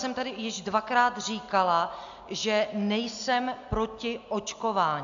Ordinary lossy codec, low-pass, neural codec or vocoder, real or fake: MP3, 64 kbps; 7.2 kHz; none; real